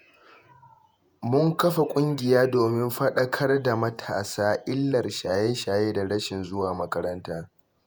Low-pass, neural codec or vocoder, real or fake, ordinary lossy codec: none; vocoder, 48 kHz, 128 mel bands, Vocos; fake; none